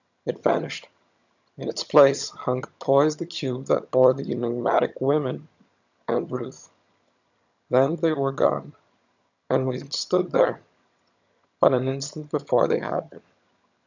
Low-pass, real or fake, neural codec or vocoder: 7.2 kHz; fake; vocoder, 22.05 kHz, 80 mel bands, HiFi-GAN